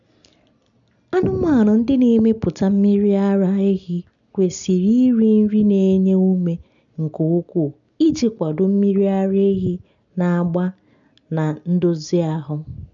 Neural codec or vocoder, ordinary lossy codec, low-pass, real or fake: none; none; 7.2 kHz; real